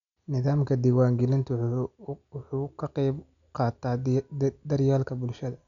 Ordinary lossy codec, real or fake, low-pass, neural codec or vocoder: none; real; 7.2 kHz; none